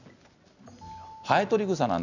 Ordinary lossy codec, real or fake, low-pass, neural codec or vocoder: none; real; 7.2 kHz; none